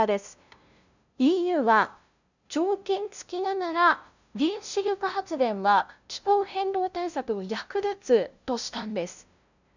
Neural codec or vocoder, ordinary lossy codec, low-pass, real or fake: codec, 16 kHz, 0.5 kbps, FunCodec, trained on LibriTTS, 25 frames a second; none; 7.2 kHz; fake